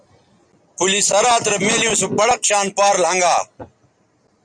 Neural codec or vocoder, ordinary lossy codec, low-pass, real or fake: none; Opus, 64 kbps; 9.9 kHz; real